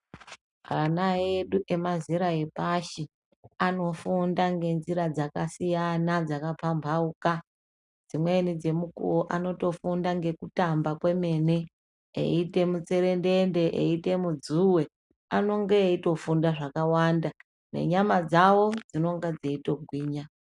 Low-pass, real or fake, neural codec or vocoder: 10.8 kHz; real; none